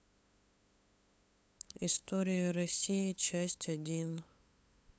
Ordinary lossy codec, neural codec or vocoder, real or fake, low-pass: none; codec, 16 kHz, 8 kbps, FunCodec, trained on LibriTTS, 25 frames a second; fake; none